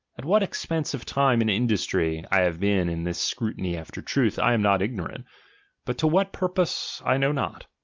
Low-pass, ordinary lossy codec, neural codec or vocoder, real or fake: 7.2 kHz; Opus, 32 kbps; none; real